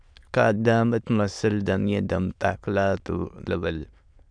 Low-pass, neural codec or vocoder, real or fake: 9.9 kHz; autoencoder, 22.05 kHz, a latent of 192 numbers a frame, VITS, trained on many speakers; fake